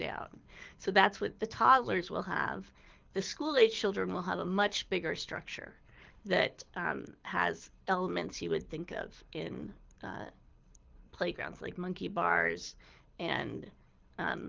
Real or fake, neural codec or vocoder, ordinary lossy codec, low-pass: fake; codec, 24 kHz, 6 kbps, HILCodec; Opus, 24 kbps; 7.2 kHz